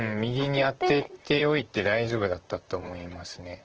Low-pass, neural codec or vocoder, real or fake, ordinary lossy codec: 7.2 kHz; vocoder, 44.1 kHz, 128 mel bands, Pupu-Vocoder; fake; Opus, 16 kbps